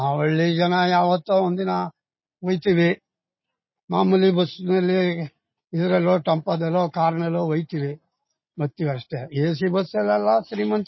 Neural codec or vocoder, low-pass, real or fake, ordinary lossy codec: none; 7.2 kHz; real; MP3, 24 kbps